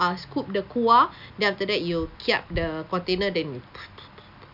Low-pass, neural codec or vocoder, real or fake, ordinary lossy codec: 5.4 kHz; none; real; none